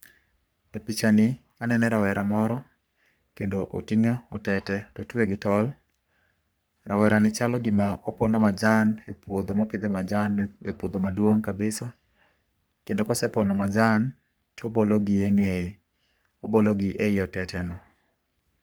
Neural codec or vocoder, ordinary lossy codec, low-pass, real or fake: codec, 44.1 kHz, 3.4 kbps, Pupu-Codec; none; none; fake